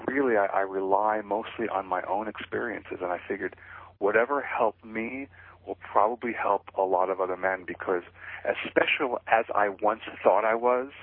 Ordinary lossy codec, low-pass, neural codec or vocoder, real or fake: MP3, 24 kbps; 5.4 kHz; none; real